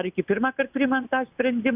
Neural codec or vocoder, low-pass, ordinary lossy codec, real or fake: none; 3.6 kHz; Opus, 64 kbps; real